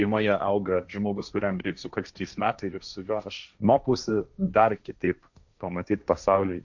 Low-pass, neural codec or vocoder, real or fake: 7.2 kHz; codec, 16 kHz, 1.1 kbps, Voila-Tokenizer; fake